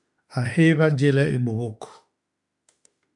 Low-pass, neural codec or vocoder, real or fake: 10.8 kHz; autoencoder, 48 kHz, 32 numbers a frame, DAC-VAE, trained on Japanese speech; fake